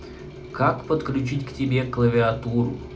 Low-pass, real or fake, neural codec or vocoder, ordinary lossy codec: none; real; none; none